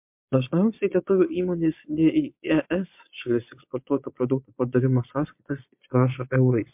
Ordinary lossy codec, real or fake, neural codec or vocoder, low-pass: MP3, 32 kbps; fake; vocoder, 22.05 kHz, 80 mel bands, Vocos; 3.6 kHz